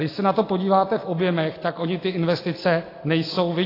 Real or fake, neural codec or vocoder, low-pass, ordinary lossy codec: real; none; 5.4 kHz; AAC, 24 kbps